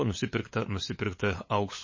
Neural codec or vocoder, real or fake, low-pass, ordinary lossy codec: codec, 16 kHz, 8 kbps, FunCodec, trained on LibriTTS, 25 frames a second; fake; 7.2 kHz; MP3, 32 kbps